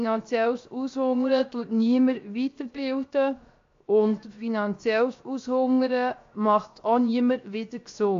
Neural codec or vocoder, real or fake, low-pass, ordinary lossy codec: codec, 16 kHz, 0.7 kbps, FocalCodec; fake; 7.2 kHz; AAC, 48 kbps